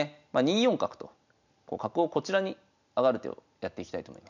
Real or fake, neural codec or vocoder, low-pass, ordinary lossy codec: real; none; 7.2 kHz; none